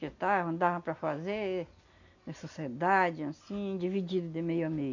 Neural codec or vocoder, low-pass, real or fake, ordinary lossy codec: none; 7.2 kHz; real; none